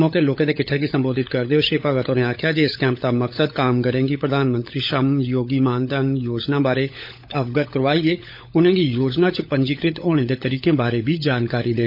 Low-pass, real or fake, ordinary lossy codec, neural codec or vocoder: 5.4 kHz; fake; none; codec, 16 kHz, 16 kbps, FunCodec, trained on LibriTTS, 50 frames a second